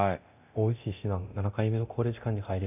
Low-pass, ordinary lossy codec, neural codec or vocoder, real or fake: 3.6 kHz; none; codec, 24 kHz, 0.9 kbps, DualCodec; fake